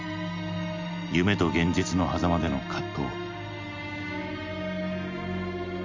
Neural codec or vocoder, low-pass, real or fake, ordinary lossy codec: none; 7.2 kHz; real; MP3, 64 kbps